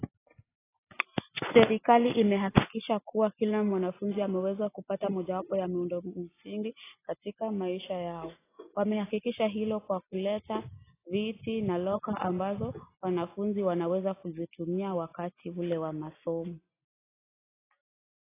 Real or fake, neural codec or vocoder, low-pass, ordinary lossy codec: real; none; 3.6 kHz; AAC, 16 kbps